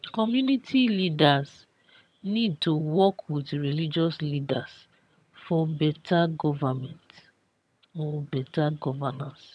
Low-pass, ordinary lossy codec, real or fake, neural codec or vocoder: none; none; fake; vocoder, 22.05 kHz, 80 mel bands, HiFi-GAN